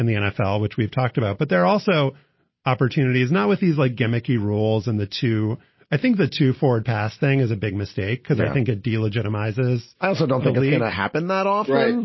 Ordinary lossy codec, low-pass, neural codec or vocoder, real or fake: MP3, 24 kbps; 7.2 kHz; none; real